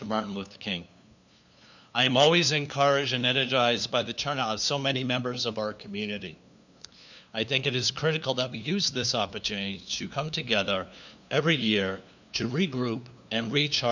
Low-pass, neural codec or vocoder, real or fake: 7.2 kHz; codec, 16 kHz, 2 kbps, FunCodec, trained on LibriTTS, 25 frames a second; fake